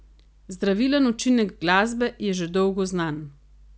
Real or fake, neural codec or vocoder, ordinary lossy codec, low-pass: real; none; none; none